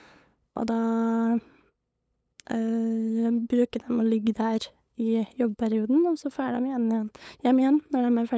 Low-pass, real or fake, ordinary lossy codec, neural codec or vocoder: none; fake; none; codec, 16 kHz, 8 kbps, FunCodec, trained on LibriTTS, 25 frames a second